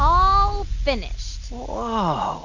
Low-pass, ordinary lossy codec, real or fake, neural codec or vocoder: 7.2 kHz; Opus, 64 kbps; real; none